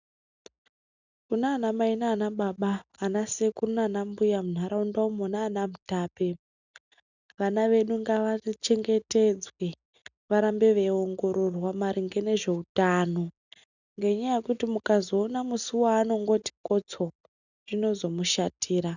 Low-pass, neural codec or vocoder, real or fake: 7.2 kHz; none; real